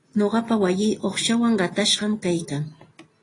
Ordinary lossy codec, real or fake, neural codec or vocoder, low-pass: AAC, 32 kbps; real; none; 10.8 kHz